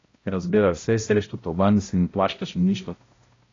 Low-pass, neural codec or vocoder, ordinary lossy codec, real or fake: 7.2 kHz; codec, 16 kHz, 0.5 kbps, X-Codec, HuBERT features, trained on balanced general audio; AAC, 32 kbps; fake